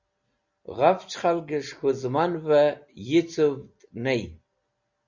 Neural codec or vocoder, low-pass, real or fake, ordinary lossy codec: none; 7.2 kHz; real; Opus, 64 kbps